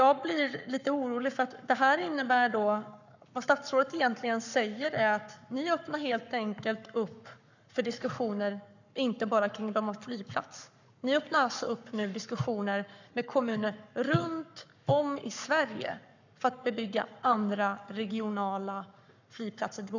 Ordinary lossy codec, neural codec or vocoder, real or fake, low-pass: none; codec, 44.1 kHz, 7.8 kbps, Pupu-Codec; fake; 7.2 kHz